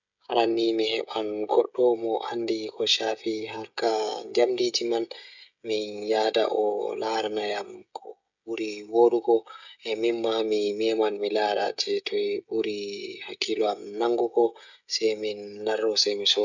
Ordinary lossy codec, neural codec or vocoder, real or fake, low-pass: none; codec, 16 kHz, 16 kbps, FreqCodec, smaller model; fake; 7.2 kHz